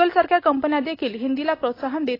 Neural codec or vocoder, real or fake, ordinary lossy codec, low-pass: none; real; AAC, 24 kbps; 5.4 kHz